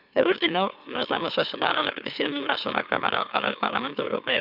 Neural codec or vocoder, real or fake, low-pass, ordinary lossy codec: autoencoder, 44.1 kHz, a latent of 192 numbers a frame, MeloTTS; fake; 5.4 kHz; none